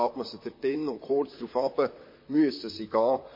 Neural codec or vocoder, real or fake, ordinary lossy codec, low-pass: vocoder, 44.1 kHz, 128 mel bands, Pupu-Vocoder; fake; MP3, 24 kbps; 5.4 kHz